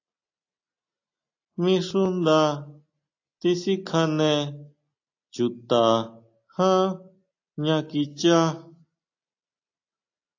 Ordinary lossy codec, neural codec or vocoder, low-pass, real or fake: AAC, 48 kbps; none; 7.2 kHz; real